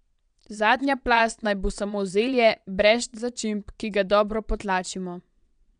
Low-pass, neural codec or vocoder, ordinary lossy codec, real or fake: 9.9 kHz; vocoder, 22.05 kHz, 80 mel bands, Vocos; none; fake